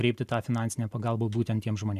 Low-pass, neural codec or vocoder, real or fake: 14.4 kHz; none; real